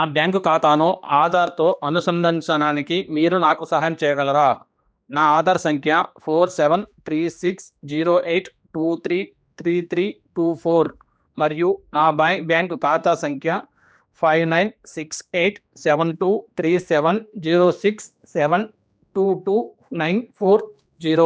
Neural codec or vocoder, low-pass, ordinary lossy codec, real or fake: codec, 16 kHz, 2 kbps, X-Codec, HuBERT features, trained on general audio; none; none; fake